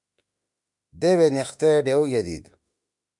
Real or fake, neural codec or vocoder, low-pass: fake; autoencoder, 48 kHz, 32 numbers a frame, DAC-VAE, trained on Japanese speech; 10.8 kHz